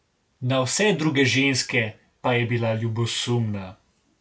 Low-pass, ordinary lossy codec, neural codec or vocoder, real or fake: none; none; none; real